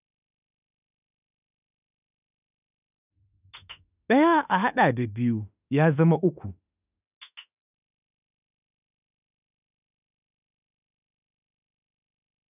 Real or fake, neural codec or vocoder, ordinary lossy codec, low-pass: fake; autoencoder, 48 kHz, 32 numbers a frame, DAC-VAE, trained on Japanese speech; none; 3.6 kHz